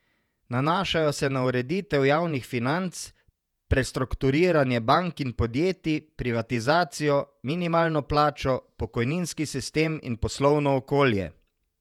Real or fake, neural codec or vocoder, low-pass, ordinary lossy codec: fake; vocoder, 48 kHz, 128 mel bands, Vocos; 19.8 kHz; none